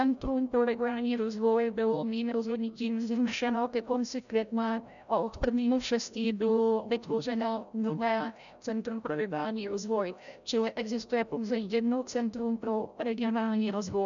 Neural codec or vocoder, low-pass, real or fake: codec, 16 kHz, 0.5 kbps, FreqCodec, larger model; 7.2 kHz; fake